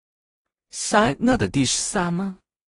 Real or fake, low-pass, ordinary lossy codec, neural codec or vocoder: fake; 10.8 kHz; AAC, 32 kbps; codec, 16 kHz in and 24 kHz out, 0.4 kbps, LongCat-Audio-Codec, two codebook decoder